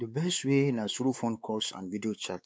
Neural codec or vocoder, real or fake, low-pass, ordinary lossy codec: codec, 16 kHz, 4 kbps, X-Codec, WavLM features, trained on Multilingual LibriSpeech; fake; none; none